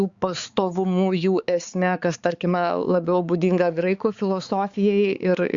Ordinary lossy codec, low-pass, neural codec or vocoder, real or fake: Opus, 64 kbps; 7.2 kHz; codec, 16 kHz, 4 kbps, X-Codec, HuBERT features, trained on balanced general audio; fake